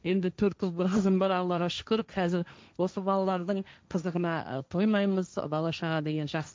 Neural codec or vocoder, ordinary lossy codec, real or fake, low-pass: codec, 16 kHz, 1.1 kbps, Voila-Tokenizer; none; fake; none